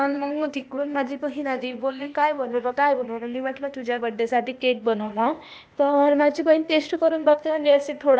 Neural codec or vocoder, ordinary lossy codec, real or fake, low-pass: codec, 16 kHz, 0.8 kbps, ZipCodec; none; fake; none